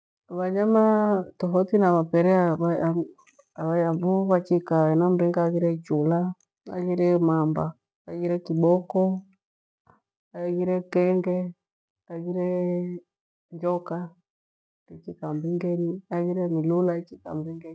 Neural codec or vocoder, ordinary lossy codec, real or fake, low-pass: none; none; real; none